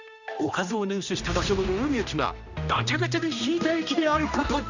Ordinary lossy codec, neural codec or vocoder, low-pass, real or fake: none; codec, 16 kHz, 1 kbps, X-Codec, HuBERT features, trained on general audio; 7.2 kHz; fake